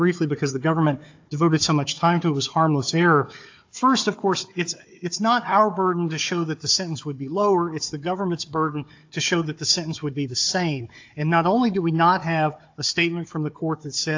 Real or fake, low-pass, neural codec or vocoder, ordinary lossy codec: fake; 7.2 kHz; codec, 16 kHz, 4 kbps, FunCodec, trained on Chinese and English, 50 frames a second; AAC, 48 kbps